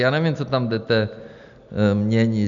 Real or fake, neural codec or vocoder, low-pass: real; none; 7.2 kHz